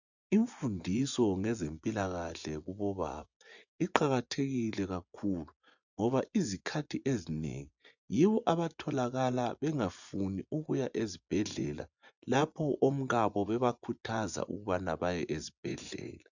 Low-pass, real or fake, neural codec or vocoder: 7.2 kHz; fake; vocoder, 24 kHz, 100 mel bands, Vocos